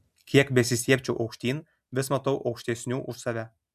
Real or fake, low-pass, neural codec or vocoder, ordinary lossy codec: real; 14.4 kHz; none; MP3, 96 kbps